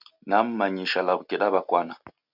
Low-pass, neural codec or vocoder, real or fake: 5.4 kHz; none; real